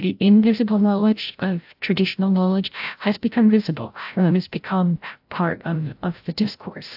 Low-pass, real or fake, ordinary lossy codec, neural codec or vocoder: 5.4 kHz; fake; AAC, 48 kbps; codec, 16 kHz, 0.5 kbps, FreqCodec, larger model